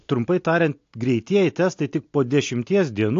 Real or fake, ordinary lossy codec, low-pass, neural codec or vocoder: real; AAC, 48 kbps; 7.2 kHz; none